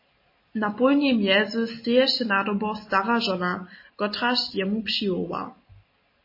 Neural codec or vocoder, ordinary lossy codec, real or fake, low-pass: none; MP3, 24 kbps; real; 5.4 kHz